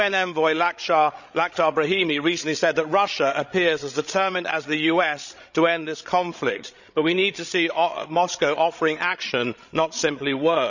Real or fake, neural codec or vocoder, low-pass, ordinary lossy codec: fake; codec, 16 kHz, 16 kbps, FreqCodec, larger model; 7.2 kHz; none